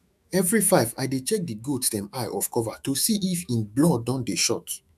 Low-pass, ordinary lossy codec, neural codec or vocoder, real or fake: 14.4 kHz; none; autoencoder, 48 kHz, 128 numbers a frame, DAC-VAE, trained on Japanese speech; fake